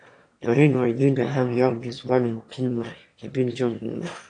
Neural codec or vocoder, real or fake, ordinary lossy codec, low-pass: autoencoder, 22.05 kHz, a latent of 192 numbers a frame, VITS, trained on one speaker; fake; AAC, 48 kbps; 9.9 kHz